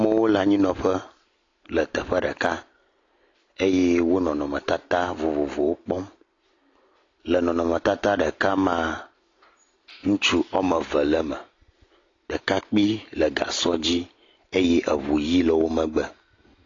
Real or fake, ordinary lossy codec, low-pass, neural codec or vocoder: real; AAC, 32 kbps; 7.2 kHz; none